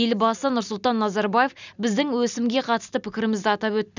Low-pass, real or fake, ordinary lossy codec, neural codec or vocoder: 7.2 kHz; real; none; none